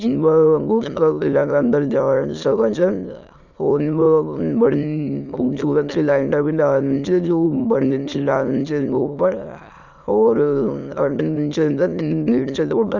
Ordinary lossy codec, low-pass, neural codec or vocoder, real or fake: none; 7.2 kHz; autoencoder, 22.05 kHz, a latent of 192 numbers a frame, VITS, trained on many speakers; fake